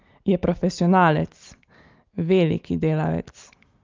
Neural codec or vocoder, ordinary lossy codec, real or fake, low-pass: none; Opus, 32 kbps; real; 7.2 kHz